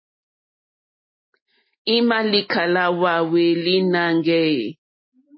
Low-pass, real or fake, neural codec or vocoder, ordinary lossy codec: 7.2 kHz; real; none; MP3, 24 kbps